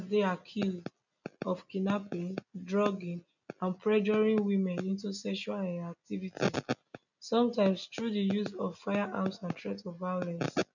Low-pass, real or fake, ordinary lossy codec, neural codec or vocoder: 7.2 kHz; real; none; none